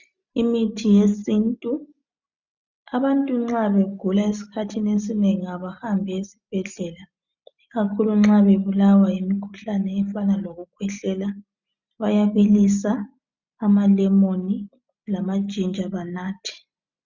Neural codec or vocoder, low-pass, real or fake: none; 7.2 kHz; real